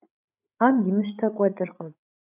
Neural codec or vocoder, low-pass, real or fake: codec, 16 kHz, 8 kbps, FreqCodec, larger model; 3.6 kHz; fake